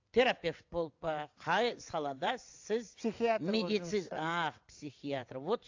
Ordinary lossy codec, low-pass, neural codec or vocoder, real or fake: AAC, 48 kbps; 7.2 kHz; vocoder, 22.05 kHz, 80 mel bands, WaveNeXt; fake